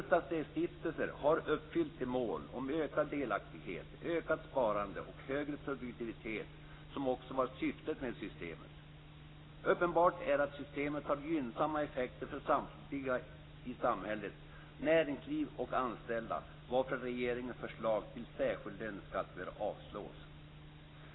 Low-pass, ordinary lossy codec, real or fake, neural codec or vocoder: 7.2 kHz; AAC, 16 kbps; real; none